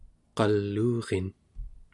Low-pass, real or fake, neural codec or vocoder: 10.8 kHz; real; none